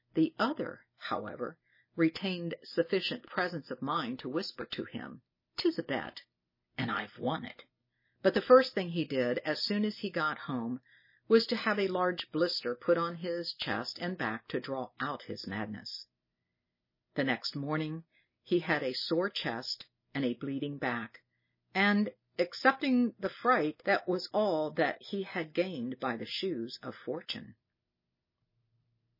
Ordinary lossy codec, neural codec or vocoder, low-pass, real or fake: MP3, 24 kbps; none; 5.4 kHz; real